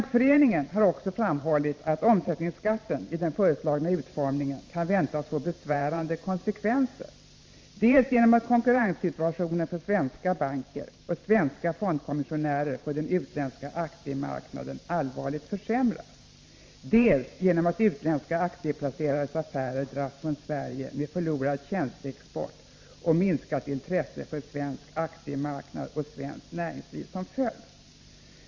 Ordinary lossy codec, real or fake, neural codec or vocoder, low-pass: Opus, 32 kbps; fake; vocoder, 44.1 kHz, 128 mel bands every 512 samples, BigVGAN v2; 7.2 kHz